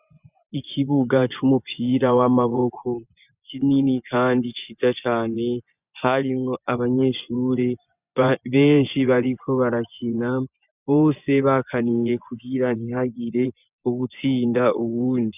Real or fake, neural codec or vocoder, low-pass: fake; vocoder, 24 kHz, 100 mel bands, Vocos; 3.6 kHz